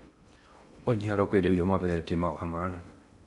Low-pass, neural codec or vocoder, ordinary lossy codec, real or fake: 10.8 kHz; codec, 16 kHz in and 24 kHz out, 0.6 kbps, FocalCodec, streaming, 2048 codes; none; fake